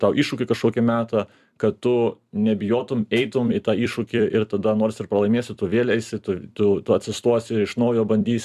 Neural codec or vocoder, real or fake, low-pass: vocoder, 44.1 kHz, 128 mel bands every 256 samples, BigVGAN v2; fake; 14.4 kHz